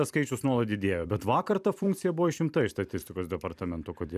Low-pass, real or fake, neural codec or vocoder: 14.4 kHz; fake; vocoder, 44.1 kHz, 128 mel bands every 256 samples, BigVGAN v2